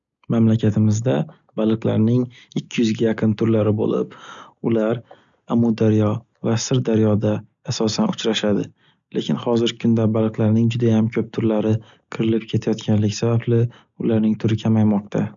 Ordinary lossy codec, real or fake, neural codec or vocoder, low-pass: none; real; none; 7.2 kHz